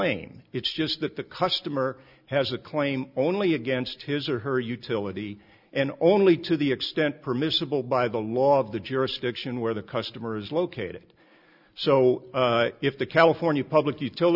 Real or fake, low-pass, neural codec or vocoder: real; 5.4 kHz; none